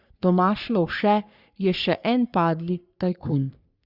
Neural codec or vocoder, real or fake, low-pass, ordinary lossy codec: codec, 44.1 kHz, 3.4 kbps, Pupu-Codec; fake; 5.4 kHz; Opus, 64 kbps